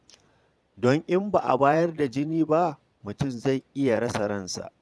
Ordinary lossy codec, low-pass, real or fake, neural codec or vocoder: none; none; fake; vocoder, 22.05 kHz, 80 mel bands, Vocos